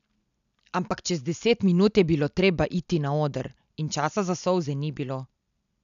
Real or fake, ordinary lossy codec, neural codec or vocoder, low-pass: real; none; none; 7.2 kHz